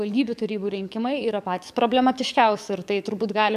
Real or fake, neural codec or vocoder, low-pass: fake; codec, 44.1 kHz, 7.8 kbps, DAC; 14.4 kHz